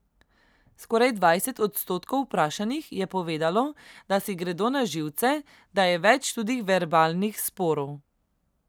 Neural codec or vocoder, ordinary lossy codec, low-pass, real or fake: none; none; none; real